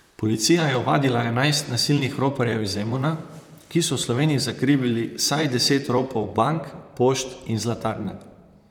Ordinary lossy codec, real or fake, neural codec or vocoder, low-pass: none; fake; vocoder, 44.1 kHz, 128 mel bands, Pupu-Vocoder; 19.8 kHz